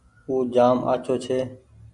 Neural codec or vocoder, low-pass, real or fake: none; 10.8 kHz; real